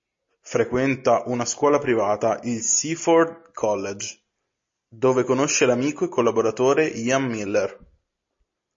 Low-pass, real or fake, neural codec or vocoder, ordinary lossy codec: 7.2 kHz; real; none; MP3, 32 kbps